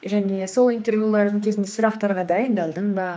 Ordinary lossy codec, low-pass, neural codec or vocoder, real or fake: none; none; codec, 16 kHz, 2 kbps, X-Codec, HuBERT features, trained on general audio; fake